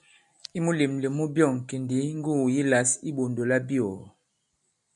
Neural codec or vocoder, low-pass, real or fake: none; 10.8 kHz; real